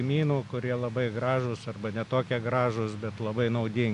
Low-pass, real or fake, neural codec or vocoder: 10.8 kHz; real; none